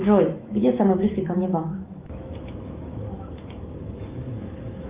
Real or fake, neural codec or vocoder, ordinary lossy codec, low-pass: fake; autoencoder, 48 kHz, 128 numbers a frame, DAC-VAE, trained on Japanese speech; Opus, 32 kbps; 3.6 kHz